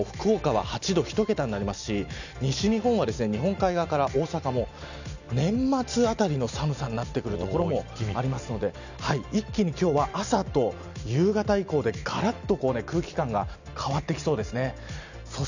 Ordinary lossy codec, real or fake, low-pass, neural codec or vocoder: none; real; 7.2 kHz; none